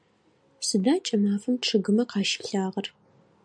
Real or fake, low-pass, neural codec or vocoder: real; 9.9 kHz; none